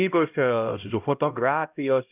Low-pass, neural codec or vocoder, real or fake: 3.6 kHz; codec, 16 kHz, 0.5 kbps, X-Codec, HuBERT features, trained on LibriSpeech; fake